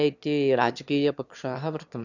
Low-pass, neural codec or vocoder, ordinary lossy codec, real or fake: 7.2 kHz; autoencoder, 22.05 kHz, a latent of 192 numbers a frame, VITS, trained on one speaker; none; fake